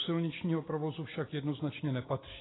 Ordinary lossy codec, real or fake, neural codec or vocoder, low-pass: AAC, 16 kbps; real; none; 7.2 kHz